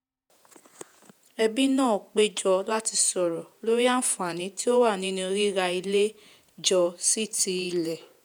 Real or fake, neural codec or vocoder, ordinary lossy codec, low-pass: fake; vocoder, 48 kHz, 128 mel bands, Vocos; none; none